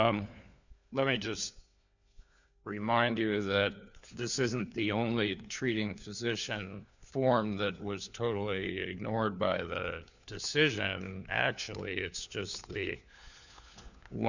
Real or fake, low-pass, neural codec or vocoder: fake; 7.2 kHz; codec, 16 kHz, 4 kbps, FunCodec, trained on LibriTTS, 50 frames a second